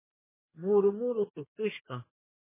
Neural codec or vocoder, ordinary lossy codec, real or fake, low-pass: codec, 32 kHz, 1.9 kbps, SNAC; MP3, 16 kbps; fake; 3.6 kHz